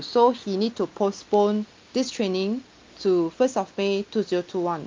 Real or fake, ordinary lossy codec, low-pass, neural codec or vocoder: real; Opus, 24 kbps; 7.2 kHz; none